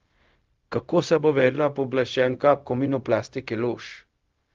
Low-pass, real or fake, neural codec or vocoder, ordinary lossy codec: 7.2 kHz; fake; codec, 16 kHz, 0.4 kbps, LongCat-Audio-Codec; Opus, 32 kbps